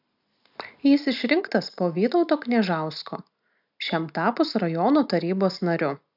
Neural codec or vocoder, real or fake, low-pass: none; real; 5.4 kHz